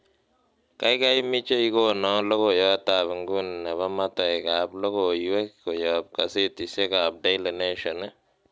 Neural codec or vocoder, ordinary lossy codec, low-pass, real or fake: none; none; none; real